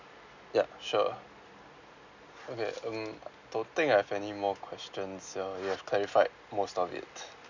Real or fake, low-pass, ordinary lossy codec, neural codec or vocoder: real; 7.2 kHz; none; none